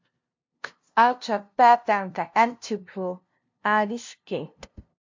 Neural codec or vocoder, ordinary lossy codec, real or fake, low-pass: codec, 16 kHz, 0.5 kbps, FunCodec, trained on LibriTTS, 25 frames a second; MP3, 48 kbps; fake; 7.2 kHz